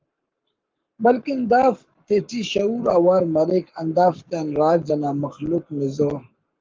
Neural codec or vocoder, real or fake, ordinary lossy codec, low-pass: codec, 44.1 kHz, 7.8 kbps, Pupu-Codec; fake; Opus, 32 kbps; 7.2 kHz